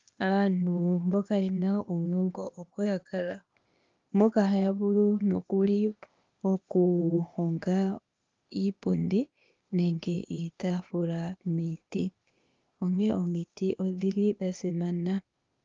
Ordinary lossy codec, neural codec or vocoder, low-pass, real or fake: Opus, 32 kbps; codec, 16 kHz, 0.8 kbps, ZipCodec; 7.2 kHz; fake